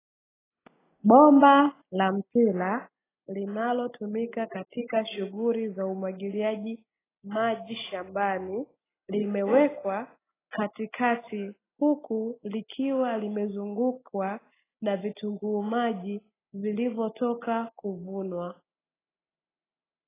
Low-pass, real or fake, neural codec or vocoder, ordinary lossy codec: 3.6 kHz; real; none; AAC, 16 kbps